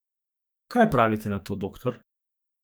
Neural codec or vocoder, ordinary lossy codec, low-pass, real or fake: codec, 44.1 kHz, 2.6 kbps, SNAC; none; none; fake